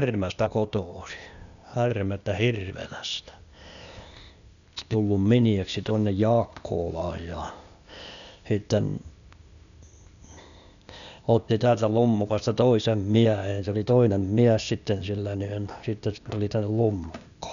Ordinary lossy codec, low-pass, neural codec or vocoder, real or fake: none; 7.2 kHz; codec, 16 kHz, 0.8 kbps, ZipCodec; fake